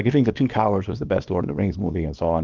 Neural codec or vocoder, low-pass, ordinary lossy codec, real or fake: codec, 24 kHz, 0.9 kbps, WavTokenizer, small release; 7.2 kHz; Opus, 24 kbps; fake